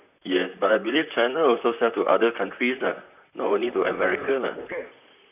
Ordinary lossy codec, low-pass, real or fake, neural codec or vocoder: none; 3.6 kHz; fake; vocoder, 44.1 kHz, 128 mel bands, Pupu-Vocoder